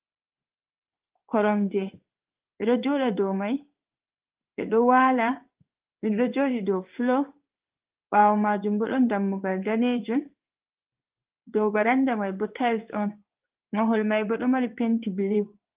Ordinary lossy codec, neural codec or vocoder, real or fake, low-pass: Opus, 32 kbps; codec, 16 kHz in and 24 kHz out, 1 kbps, XY-Tokenizer; fake; 3.6 kHz